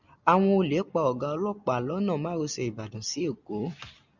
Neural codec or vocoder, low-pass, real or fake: none; 7.2 kHz; real